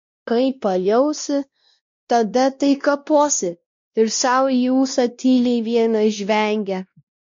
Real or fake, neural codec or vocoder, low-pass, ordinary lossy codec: fake; codec, 16 kHz, 1 kbps, X-Codec, WavLM features, trained on Multilingual LibriSpeech; 7.2 kHz; MP3, 48 kbps